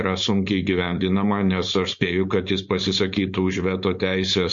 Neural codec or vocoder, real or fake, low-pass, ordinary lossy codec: codec, 16 kHz, 4.8 kbps, FACodec; fake; 7.2 kHz; MP3, 48 kbps